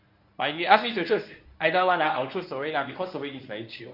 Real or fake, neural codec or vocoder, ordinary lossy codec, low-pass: fake; codec, 24 kHz, 0.9 kbps, WavTokenizer, medium speech release version 1; none; 5.4 kHz